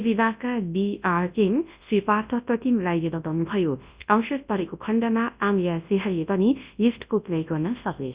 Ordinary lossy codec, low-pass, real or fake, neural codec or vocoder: Opus, 64 kbps; 3.6 kHz; fake; codec, 24 kHz, 0.9 kbps, WavTokenizer, large speech release